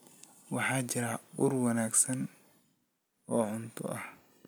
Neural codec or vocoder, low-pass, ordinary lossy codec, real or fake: none; none; none; real